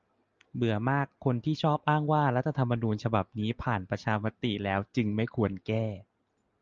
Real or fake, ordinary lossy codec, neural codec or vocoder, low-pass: real; Opus, 24 kbps; none; 7.2 kHz